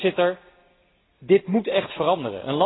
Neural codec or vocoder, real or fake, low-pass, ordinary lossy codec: none; real; 7.2 kHz; AAC, 16 kbps